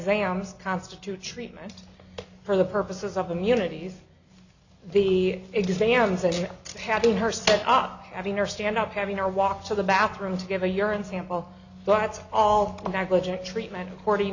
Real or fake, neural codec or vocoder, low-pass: real; none; 7.2 kHz